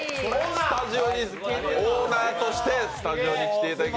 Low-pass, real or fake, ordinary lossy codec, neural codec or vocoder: none; real; none; none